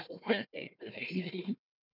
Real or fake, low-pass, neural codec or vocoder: fake; 5.4 kHz; codec, 16 kHz, 1 kbps, FunCodec, trained on Chinese and English, 50 frames a second